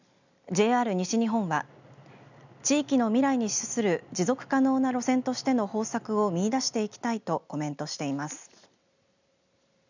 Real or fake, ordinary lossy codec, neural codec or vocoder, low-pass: real; none; none; 7.2 kHz